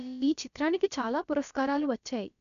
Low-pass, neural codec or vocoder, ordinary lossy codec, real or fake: 7.2 kHz; codec, 16 kHz, about 1 kbps, DyCAST, with the encoder's durations; none; fake